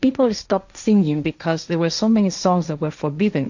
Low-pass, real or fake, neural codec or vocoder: 7.2 kHz; fake; codec, 16 kHz, 1.1 kbps, Voila-Tokenizer